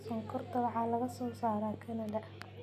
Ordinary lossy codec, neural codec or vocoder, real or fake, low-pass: none; none; real; 14.4 kHz